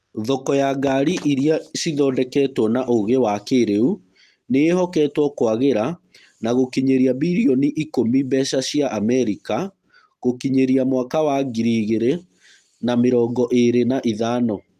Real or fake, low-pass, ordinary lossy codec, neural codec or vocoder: real; 14.4 kHz; Opus, 32 kbps; none